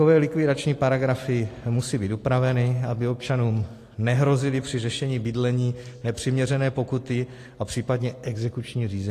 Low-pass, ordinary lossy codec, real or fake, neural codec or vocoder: 14.4 kHz; AAC, 48 kbps; real; none